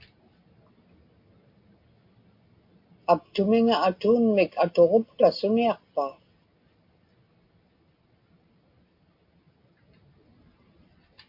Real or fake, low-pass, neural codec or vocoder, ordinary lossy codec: real; 5.4 kHz; none; MP3, 32 kbps